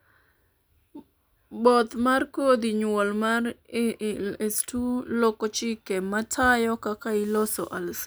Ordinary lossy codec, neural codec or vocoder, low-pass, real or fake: none; none; none; real